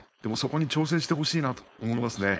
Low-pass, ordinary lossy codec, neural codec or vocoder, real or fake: none; none; codec, 16 kHz, 4.8 kbps, FACodec; fake